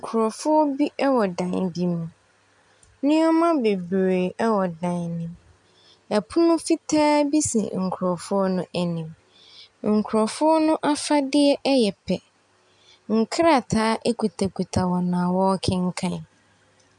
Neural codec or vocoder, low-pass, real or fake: none; 10.8 kHz; real